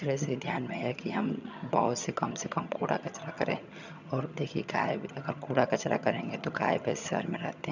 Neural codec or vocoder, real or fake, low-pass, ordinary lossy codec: vocoder, 22.05 kHz, 80 mel bands, HiFi-GAN; fake; 7.2 kHz; none